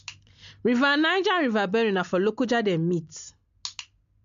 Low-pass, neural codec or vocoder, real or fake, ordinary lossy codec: 7.2 kHz; none; real; MP3, 64 kbps